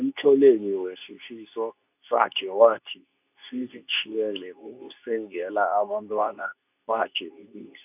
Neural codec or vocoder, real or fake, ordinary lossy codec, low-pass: codec, 24 kHz, 0.9 kbps, WavTokenizer, medium speech release version 2; fake; none; 3.6 kHz